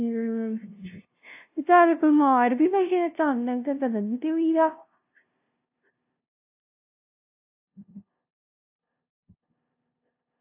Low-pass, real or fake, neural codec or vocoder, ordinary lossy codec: 3.6 kHz; fake; codec, 16 kHz, 0.5 kbps, FunCodec, trained on LibriTTS, 25 frames a second; AAC, 32 kbps